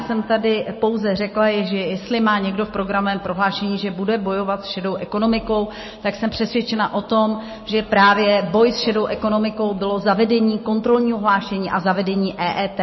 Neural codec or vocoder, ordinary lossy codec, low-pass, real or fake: none; MP3, 24 kbps; 7.2 kHz; real